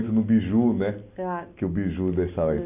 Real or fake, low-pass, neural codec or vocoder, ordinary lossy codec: real; 3.6 kHz; none; none